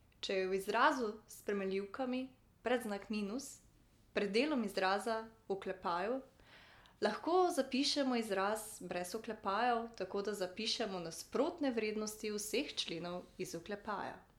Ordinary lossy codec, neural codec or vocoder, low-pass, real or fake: MP3, 96 kbps; none; 19.8 kHz; real